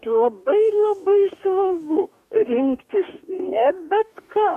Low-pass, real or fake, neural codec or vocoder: 14.4 kHz; fake; codec, 44.1 kHz, 2.6 kbps, DAC